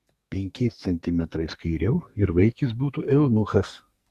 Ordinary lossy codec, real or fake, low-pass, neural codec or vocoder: Opus, 64 kbps; fake; 14.4 kHz; codec, 44.1 kHz, 2.6 kbps, SNAC